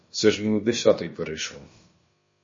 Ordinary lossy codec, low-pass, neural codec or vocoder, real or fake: MP3, 32 kbps; 7.2 kHz; codec, 16 kHz, about 1 kbps, DyCAST, with the encoder's durations; fake